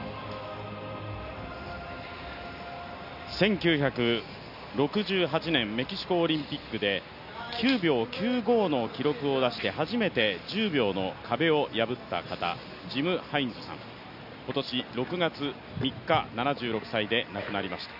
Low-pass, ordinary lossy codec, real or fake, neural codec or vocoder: 5.4 kHz; none; real; none